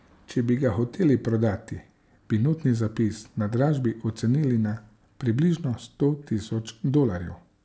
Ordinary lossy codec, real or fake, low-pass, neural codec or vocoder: none; real; none; none